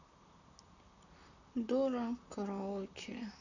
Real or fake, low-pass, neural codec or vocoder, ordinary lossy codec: fake; 7.2 kHz; vocoder, 44.1 kHz, 128 mel bands, Pupu-Vocoder; none